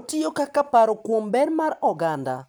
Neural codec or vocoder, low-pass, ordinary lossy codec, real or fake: none; none; none; real